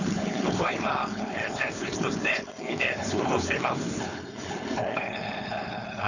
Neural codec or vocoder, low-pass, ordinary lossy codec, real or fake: codec, 16 kHz, 4.8 kbps, FACodec; 7.2 kHz; none; fake